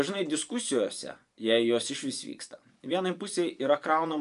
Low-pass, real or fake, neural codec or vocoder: 10.8 kHz; fake; vocoder, 24 kHz, 100 mel bands, Vocos